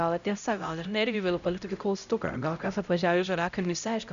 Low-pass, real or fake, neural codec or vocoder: 7.2 kHz; fake; codec, 16 kHz, 0.5 kbps, X-Codec, HuBERT features, trained on LibriSpeech